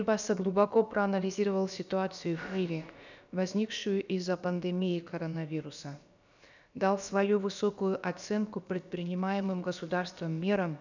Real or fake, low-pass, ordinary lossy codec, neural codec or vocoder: fake; 7.2 kHz; none; codec, 16 kHz, about 1 kbps, DyCAST, with the encoder's durations